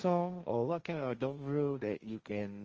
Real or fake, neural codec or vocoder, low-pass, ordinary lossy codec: fake; codec, 16 kHz, 1.1 kbps, Voila-Tokenizer; 7.2 kHz; Opus, 24 kbps